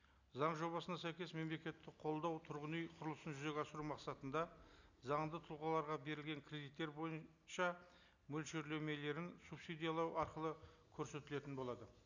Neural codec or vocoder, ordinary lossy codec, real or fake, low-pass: none; none; real; 7.2 kHz